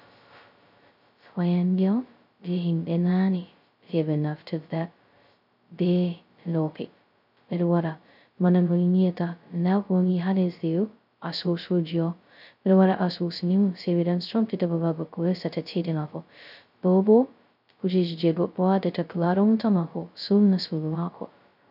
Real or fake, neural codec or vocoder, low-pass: fake; codec, 16 kHz, 0.2 kbps, FocalCodec; 5.4 kHz